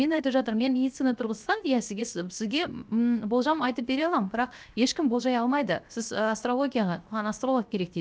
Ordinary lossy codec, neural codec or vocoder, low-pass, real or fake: none; codec, 16 kHz, 0.7 kbps, FocalCodec; none; fake